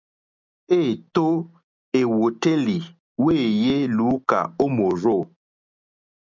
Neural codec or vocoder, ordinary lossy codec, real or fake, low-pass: none; MP3, 64 kbps; real; 7.2 kHz